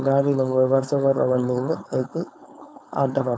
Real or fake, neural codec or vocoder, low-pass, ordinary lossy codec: fake; codec, 16 kHz, 4.8 kbps, FACodec; none; none